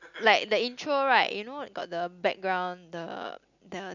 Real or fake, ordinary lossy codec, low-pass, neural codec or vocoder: real; none; 7.2 kHz; none